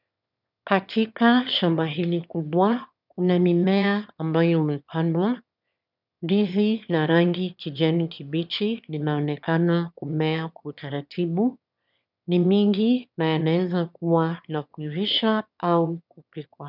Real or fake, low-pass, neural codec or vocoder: fake; 5.4 kHz; autoencoder, 22.05 kHz, a latent of 192 numbers a frame, VITS, trained on one speaker